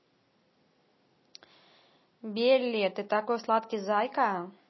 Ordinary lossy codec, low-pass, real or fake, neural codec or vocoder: MP3, 24 kbps; 7.2 kHz; real; none